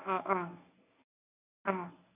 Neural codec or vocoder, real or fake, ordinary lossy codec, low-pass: codec, 24 kHz, 0.9 kbps, WavTokenizer, medium speech release version 1; fake; none; 3.6 kHz